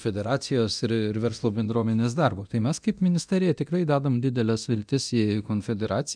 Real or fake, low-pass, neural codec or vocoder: fake; 9.9 kHz; codec, 24 kHz, 0.9 kbps, DualCodec